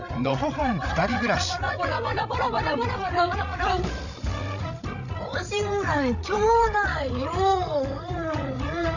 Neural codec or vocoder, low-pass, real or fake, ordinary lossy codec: codec, 16 kHz, 8 kbps, FreqCodec, larger model; 7.2 kHz; fake; none